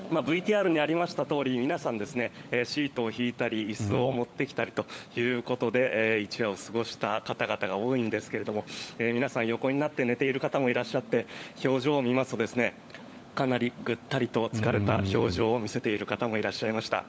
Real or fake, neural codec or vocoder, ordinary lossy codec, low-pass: fake; codec, 16 kHz, 16 kbps, FunCodec, trained on LibriTTS, 50 frames a second; none; none